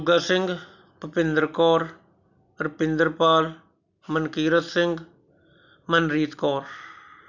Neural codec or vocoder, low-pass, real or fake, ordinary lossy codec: none; 7.2 kHz; real; none